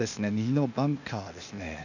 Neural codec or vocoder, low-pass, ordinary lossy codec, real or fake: codec, 16 kHz, 0.8 kbps, ZipCodec; 7.2 kHz; none; fake